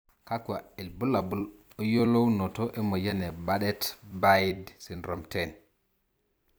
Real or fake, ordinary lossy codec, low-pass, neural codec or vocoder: fake; none; none; vocoder, 44.1 kHz, 128 mel bands every 256 samples, BigVGAN v2